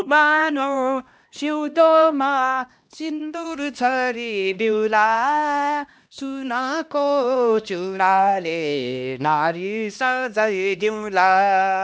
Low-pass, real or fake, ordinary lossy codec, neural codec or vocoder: none; fake; none; codec, 16 kHz, 1 kbps, X-Codec, HuBERT features, trained on LibriSpeech